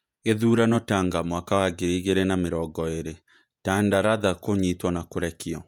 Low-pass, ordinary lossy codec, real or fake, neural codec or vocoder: 19.8 kHz; none; fake; vocoder, 48 kHz, 128 mel bands, Vocos